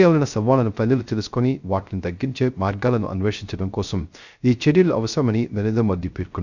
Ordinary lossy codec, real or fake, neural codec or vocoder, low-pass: none; fake; codec, 16 kHz, 0.3 kbps, FocalCodec; 7.2 kHz